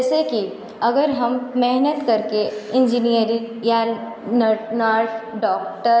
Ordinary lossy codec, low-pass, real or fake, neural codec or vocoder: none; none; real; none